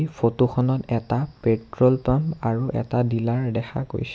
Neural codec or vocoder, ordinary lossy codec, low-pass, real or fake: none; none; none; real